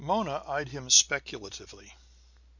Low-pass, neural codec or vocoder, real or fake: 7.2 kHz; none; real